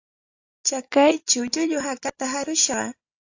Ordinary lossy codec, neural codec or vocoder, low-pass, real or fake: AAC, 48 kbps; none; 7.2 kHz; real